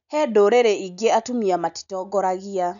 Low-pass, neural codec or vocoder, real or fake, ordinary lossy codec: 7.2 kHz; none; real; none